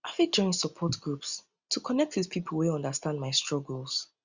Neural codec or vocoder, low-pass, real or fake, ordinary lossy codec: none; 7.2 kHz; real; Opus, 64 kbps